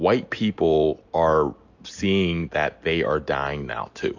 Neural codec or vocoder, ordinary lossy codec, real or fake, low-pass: none; AAC, 48 kbps; real; 7.2 kHz